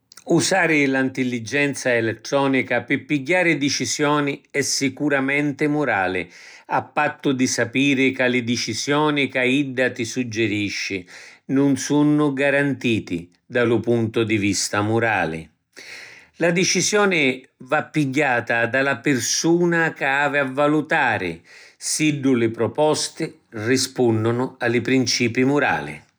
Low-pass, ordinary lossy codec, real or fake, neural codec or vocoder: none; none; real; none